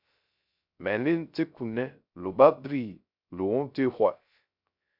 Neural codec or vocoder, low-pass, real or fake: codec, 16 kHz, 0.3 kbps, FocalCodec; 5.4 kHz; fake